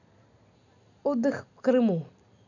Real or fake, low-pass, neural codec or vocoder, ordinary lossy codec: real; 7.2 kHz; none; none